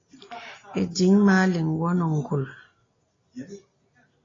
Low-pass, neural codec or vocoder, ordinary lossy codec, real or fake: 7.2 kHz; none; AAC, 32 kbps; real